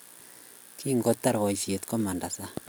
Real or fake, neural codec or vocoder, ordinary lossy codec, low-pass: real; none; none; none